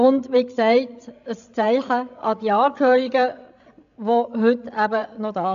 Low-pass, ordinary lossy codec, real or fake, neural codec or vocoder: 7.2 kHz; AAC, 96 kbps; fake; codec, 16 kHz, 16 kbps, FreqCodec, larger model